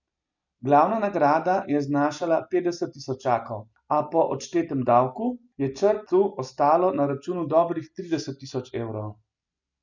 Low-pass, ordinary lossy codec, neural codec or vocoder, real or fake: 7.2 kHz; none; none; real